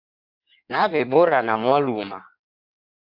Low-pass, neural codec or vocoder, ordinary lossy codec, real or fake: 5.4 kHz; codec, 16 kHz in and 24 kHz out, 1.1 kbps, FireRedTTS-2 codec; AAC, 48 kbps; fake